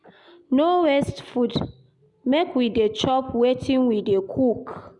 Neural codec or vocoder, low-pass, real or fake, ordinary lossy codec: none; 10.8 kHz; real; none